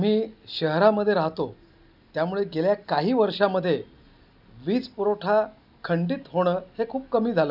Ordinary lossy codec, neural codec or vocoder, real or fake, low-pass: none; none; real; 5.4 kHz